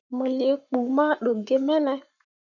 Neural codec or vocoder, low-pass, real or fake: codec, 44.1 kHz, 7.8 kbps, Pupu-Codec; 7.2 kHz; fake